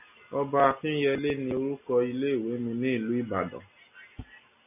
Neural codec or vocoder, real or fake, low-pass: none; real; 3.6 kHz